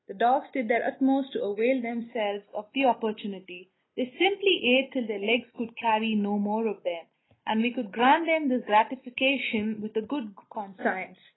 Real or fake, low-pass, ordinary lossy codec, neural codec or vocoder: real; 7.2 kHz; AAC, 16 kbps; none